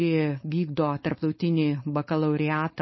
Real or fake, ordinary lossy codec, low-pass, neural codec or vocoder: fake; MP3, 24 kbps; 7.2 kHz; codec, 16 kHz, 4.8 kbps, FACodec